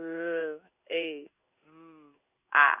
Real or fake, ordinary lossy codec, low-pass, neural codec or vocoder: fake; none; 3.6 kHz; codec, 16 kHz in and 24 kHz out, 1 kbps, XY-Tokenizer